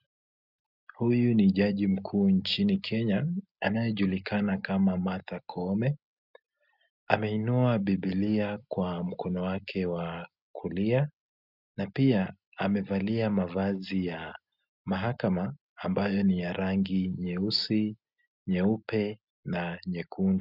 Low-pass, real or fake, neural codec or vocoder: 5.4 kHz; real; none